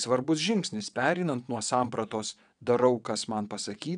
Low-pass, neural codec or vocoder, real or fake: 9.9 kHz; vocoder, 22.05 kHz, 80 mel bands, WaveNeXt; fake